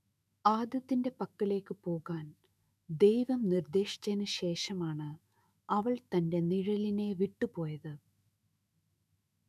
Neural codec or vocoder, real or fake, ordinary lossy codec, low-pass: autoencoder, 48 kHz, 128 numbers a frame, DAC-VAE, trained on Japanese speech; fake; none; 14.4 kHz